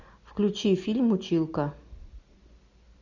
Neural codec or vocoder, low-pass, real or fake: none; 7.2 kHz; real